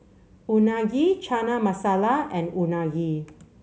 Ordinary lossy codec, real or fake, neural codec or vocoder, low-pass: none; real; none; none